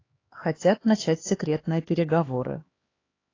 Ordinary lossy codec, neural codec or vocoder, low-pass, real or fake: AAC, 32 kbps; codec, 16 kHz, 2 kbps, X-Codec, HuBERT features, trained on LibriSpeech; 7.2 kHz; fake